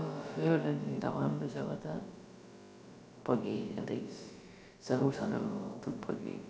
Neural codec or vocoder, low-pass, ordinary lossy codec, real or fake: codec, 16 kHz, about 1 kbps, DyCAST, with the encoder's durations; none; none; fake